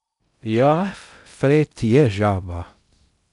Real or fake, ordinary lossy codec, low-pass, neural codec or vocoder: fake; none; 10.8 kHz; codec, 16 kHz in and 24 kHz out, 0.6 kbps, FocalCodec, streaming, 2048 codes